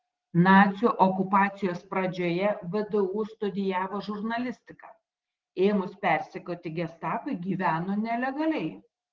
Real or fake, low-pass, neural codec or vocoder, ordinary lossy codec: real; 7.2 kHz; none; Opus, 16 kbps